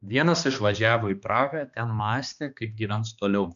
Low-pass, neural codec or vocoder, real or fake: 7.2 kHz; codec, 16 kHz, 2 kbps, X-Codec, HuBERT features, trained on balanced general audio; fake